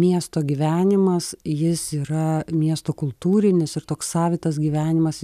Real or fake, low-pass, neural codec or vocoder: real; 14.4 kHz; none